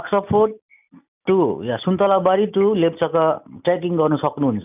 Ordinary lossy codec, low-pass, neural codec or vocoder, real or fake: none; 3.6 kHz; none; real